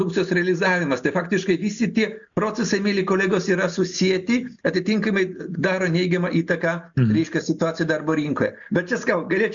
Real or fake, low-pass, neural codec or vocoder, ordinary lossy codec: real; 7.2 kHz; none; MP3, 64 kbps